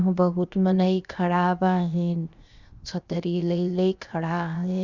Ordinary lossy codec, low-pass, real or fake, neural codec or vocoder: Opus, 64 kbps; 7.2 kHz; fake; codec, 16 kHz, 0.7 kbps, FocalCodec